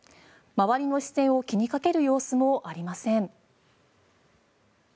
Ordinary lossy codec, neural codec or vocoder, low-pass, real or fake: none; none; none; real